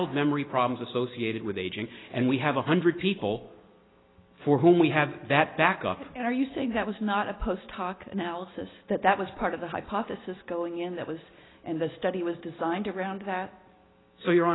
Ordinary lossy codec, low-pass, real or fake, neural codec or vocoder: AAC, 16 kbps; 7.2 kHz; real; none